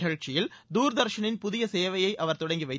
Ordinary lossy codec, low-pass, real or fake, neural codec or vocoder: none; none; real; none